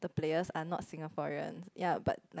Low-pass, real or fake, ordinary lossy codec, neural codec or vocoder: none; real; none; none